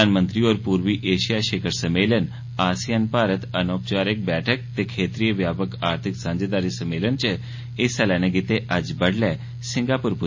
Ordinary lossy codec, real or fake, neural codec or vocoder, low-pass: MP3, 32 kbps; real; none; 7.2 kHz